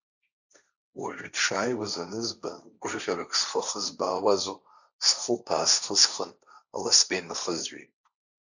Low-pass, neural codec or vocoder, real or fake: 7.2 kHz; codec, 16 kHz, 1.1 kbps, Voila-Tokenizer; fake